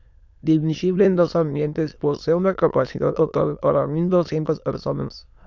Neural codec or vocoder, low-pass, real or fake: autoencoder, 22.05 kHz, a latent of 192 numbers a frame, VITS, trained on many speakers; 7.2 kHz; fake